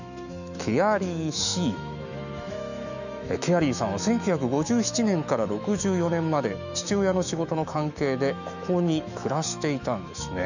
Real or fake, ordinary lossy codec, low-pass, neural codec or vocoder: fake; none; 7.2 kHz; autoencoder, 48 kHz, 128 numbers a frame, DAC-VAE, trained on Japanese speech